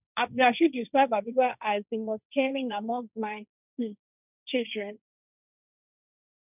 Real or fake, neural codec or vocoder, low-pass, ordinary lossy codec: fake; codec, 16 kHz, 1.1 kbps, Voila-Tokenizer; 3.6 kHz; none